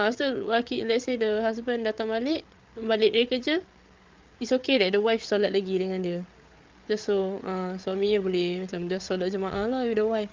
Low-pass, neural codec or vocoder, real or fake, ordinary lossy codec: 7.2 kHz; codec, 16 kHz, 8 kbps, FreqCodec, larger model; fake; Opus, 32 kbps